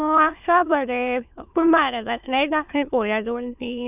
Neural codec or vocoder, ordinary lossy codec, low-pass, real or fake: autoencoder, 22.05 kHz, a latent of 192 numbers a frame, VITS, trained on many speakers; none; 3.6 kHz; fake